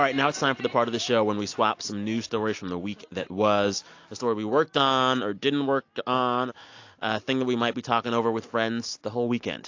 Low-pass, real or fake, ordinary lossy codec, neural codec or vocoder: 7.2 kHz; real; AAC, 48 kbps; none